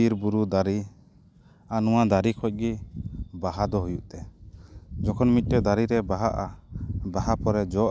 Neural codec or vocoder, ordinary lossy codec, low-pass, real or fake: none; none; none; real